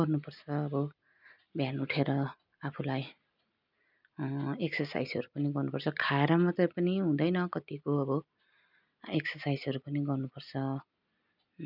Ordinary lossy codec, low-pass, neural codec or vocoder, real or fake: none; 5.4 kHz; none; real